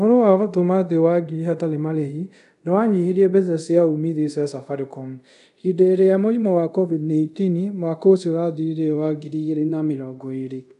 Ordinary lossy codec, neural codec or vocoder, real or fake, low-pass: none; codec, 24 kHz, 0.5 kbps, DualCodec; fake; 10.8 kHz